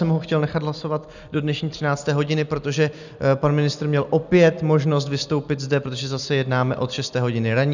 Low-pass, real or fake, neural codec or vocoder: 7.2 kHz; real; none